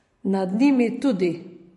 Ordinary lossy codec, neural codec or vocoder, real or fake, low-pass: MP3, 48 kbps; vocoder, 44.1 kHz, 128 mel bands every 512 samples, BigVGAN v2; fake; 14.4 kHz